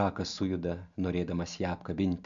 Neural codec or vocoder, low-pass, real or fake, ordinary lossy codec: none; 7.2 kHz; real; MP3, 96 kbps